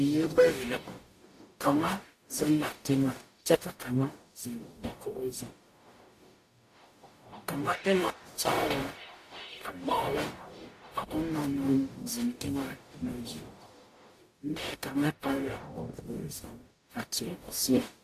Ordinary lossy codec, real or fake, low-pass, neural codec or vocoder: AAC, 64 kbps; fake; 14.4 kHz; codec, 44.1 kHz, 0.9 kbps, DAC